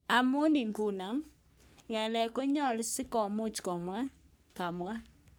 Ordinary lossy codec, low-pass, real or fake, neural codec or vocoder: none; none; fake; codec, 44.1 kHz, 3.4 kbps, Pupu-Codec